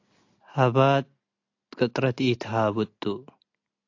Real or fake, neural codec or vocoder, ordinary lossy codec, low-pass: real; none; AAC, 48 kbps; 7.2 kHz